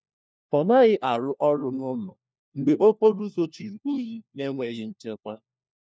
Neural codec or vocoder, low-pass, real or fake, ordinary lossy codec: codec, 16 kHz, 1 kbps, FunCodec, trained on LibriTTS, 50 frames a second; none; fake; none